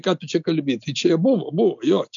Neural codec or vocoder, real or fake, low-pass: none; real; 7.2 kHz